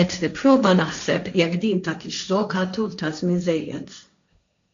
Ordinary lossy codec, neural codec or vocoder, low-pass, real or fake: AAC, 64 kbps; codec, 16 kHz, 1.1 kbps, Voila-Tokenizer; 7.2 kHz; fake